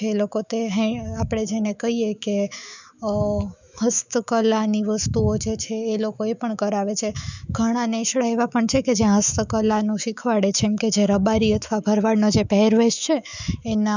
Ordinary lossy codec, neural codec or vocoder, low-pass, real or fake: none; autoencoder, 48 kHz, 128 numbers a frame, DAC-VAE, trained on Japanese speech; 7.2 kHz; fake